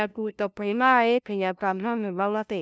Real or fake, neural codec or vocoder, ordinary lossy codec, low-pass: fake; codec, 16 kHz, 0.5 kbps, FunCodec, trained on LibriTTS, 25 frames a second; none; none